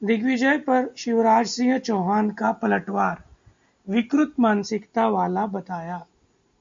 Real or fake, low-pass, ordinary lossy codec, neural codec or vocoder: real; 7.2 kHz; MP3, 48 kbps; none